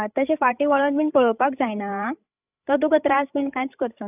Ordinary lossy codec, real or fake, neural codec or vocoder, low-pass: none; fake; codec, 16 kHz, 16 kbps, FreqCodec, larger model; 3.6 kHz